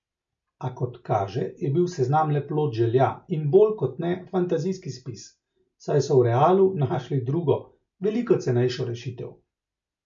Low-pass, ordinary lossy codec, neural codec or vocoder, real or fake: 7.2 kHz; MP3, 48 kbps; none; real